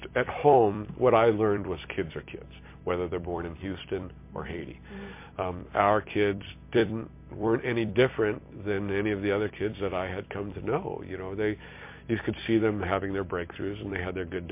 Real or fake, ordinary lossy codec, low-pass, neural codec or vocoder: fake; MP3, 24 kbps; 3.6 kHz; vocoder, 44.1 kHz, 128 mel bands every 256 samples, BigVGAN v2